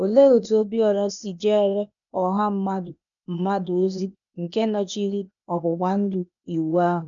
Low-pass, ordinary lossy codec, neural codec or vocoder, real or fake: 7.2 kHz; none; codec, 16 kHz, 0.8 kbps, ZipCodec; fake